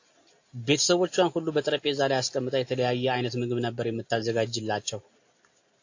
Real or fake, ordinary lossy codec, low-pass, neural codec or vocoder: real; AAC, 48 kbps; 7.2 kHz; none